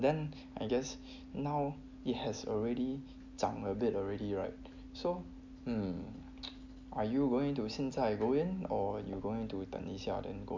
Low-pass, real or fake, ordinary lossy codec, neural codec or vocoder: 7.2 kHz; real; MP3, 64 kbps; none